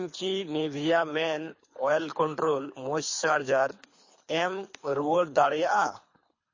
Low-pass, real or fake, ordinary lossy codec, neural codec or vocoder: 7.2 kHz; fake; MP3, 32 kbps; codec, 24 kHz, 3 kbps, HILCodec